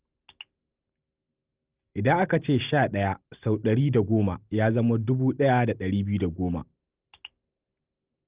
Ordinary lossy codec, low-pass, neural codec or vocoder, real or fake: Opus, 32 kbps; 3.6 kHz; none; real